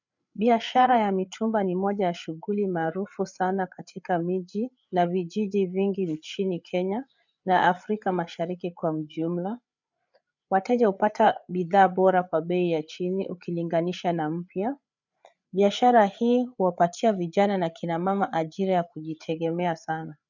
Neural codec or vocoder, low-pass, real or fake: codec, 16 kHz, 8 kbps, FreqCodec, larger model; 7.2 kHz; fake